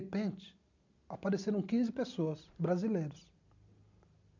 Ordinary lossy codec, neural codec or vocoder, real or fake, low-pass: none; none; real; 7.2 kHz